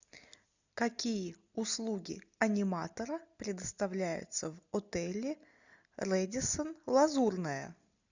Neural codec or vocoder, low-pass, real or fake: none; 7.2 kHz; real